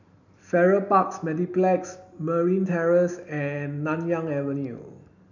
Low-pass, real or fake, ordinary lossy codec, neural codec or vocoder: 7.2 kHz; real; none; none